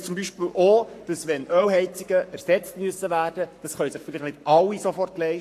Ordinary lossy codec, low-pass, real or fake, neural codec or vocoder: AAC, 64 kbps; 14.4 kHz; fake; codec, 44.1 kHz, 7.8 kbps, Pupu-Codec